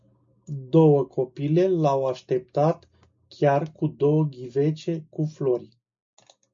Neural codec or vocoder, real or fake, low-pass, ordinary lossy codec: none; real; 7.2 kHz; MP3, 48 kbps